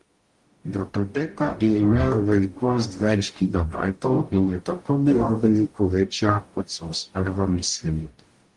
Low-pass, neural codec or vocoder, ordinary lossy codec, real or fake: 10.8 kHz; codec, 44.1 kHz, 0.9 kbps, DAC; Opus, 24 kbps; fake